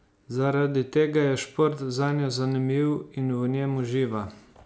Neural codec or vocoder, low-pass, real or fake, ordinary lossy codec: none; none; real; none